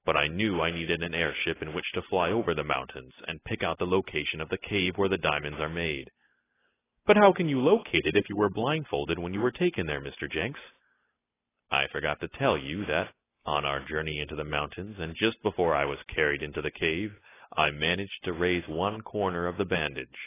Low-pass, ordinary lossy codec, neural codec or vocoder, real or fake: 3.6 kHz; AAC, 16 kbps; none; real